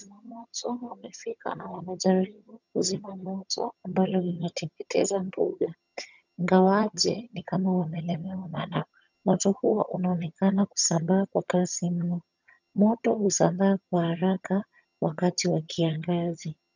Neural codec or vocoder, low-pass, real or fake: vocoder, 22.05 kHz, 80 mel bands, HiFi-GAN; 7.2 kHz; fake